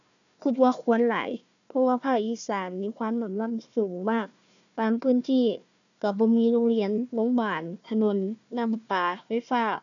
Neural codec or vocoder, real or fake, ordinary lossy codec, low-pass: codec, 16 kHz, 1 kbps, FunCodec, trained on Chinese and English, 50 frames a second; fake; none; 7.2 kHz